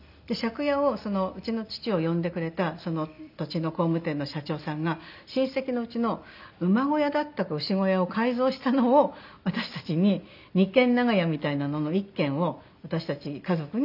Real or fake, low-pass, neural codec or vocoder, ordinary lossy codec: real; 5.4 kHz; none; none